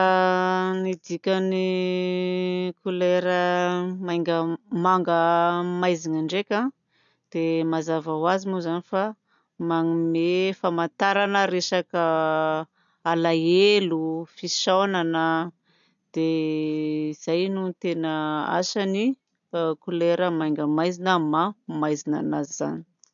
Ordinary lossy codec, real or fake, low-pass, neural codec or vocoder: none; real; 7.2 kHz; none